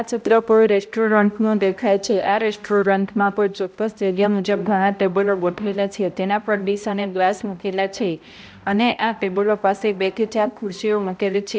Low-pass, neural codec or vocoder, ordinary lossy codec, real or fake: none; codec, 16 kHz, 0.5 kbps, X-Codec, HuBERT features, trained on balanced general audio; none; fake